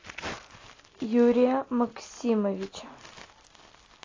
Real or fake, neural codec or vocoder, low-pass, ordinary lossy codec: real; none; 7.2 kHz; AAC, 32 kbps